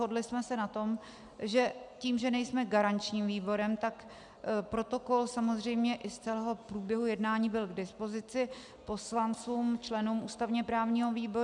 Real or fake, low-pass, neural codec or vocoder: real; 10.8 kHz; none